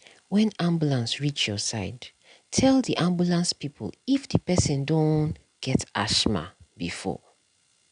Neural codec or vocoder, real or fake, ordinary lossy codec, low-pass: none; real; none; 9.9 kHz